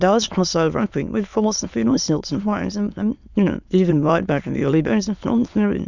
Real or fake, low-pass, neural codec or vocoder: fake; 7.2 kHz; autoencoder, 22.05 kHz, a latent of 192 numbers a frame, VITS, trained on many speakers